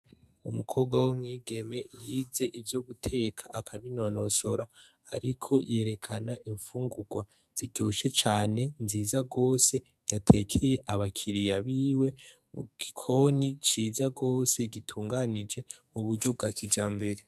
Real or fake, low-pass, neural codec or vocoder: fake; 14.4 kHz; codec, 44.1 kHz, 2.6 kbps, SNAC